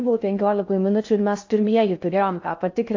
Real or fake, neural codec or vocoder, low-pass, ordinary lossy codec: fake; codec, 16 kHz in and 24 kHz out, 0.6 kbps, FocalCodec, streaming, 4096 codes; 7.2 kHz; AAC, 48 kbps